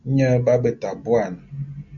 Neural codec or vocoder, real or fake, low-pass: none; real; 7.2 kHz